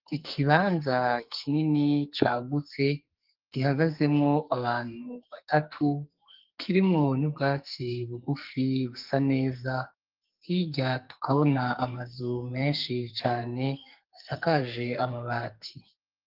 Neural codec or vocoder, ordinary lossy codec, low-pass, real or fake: codec, 44.1 kHz, 2.6 kbps, SNAC; Opus, 32 kbps; 5.4 kHz; fake